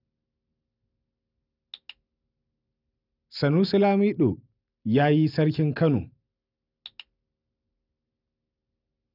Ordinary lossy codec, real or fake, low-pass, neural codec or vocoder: none; real; 5.4 kHz; none